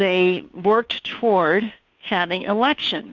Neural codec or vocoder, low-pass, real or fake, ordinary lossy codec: codec, 16 kHz, 2 kbps, FunCodec, trained on Chinese and English, 25 frames a second; 7.2 kHz; fake; Opus, 64 kbps